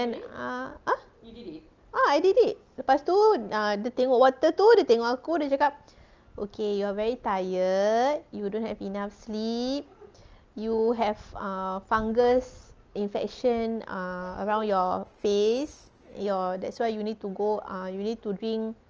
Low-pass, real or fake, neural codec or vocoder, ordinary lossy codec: 7.2 kHz; real; none; Opus, 24 kbps